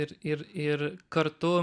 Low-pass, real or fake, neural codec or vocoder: 9.9 kHz; real; none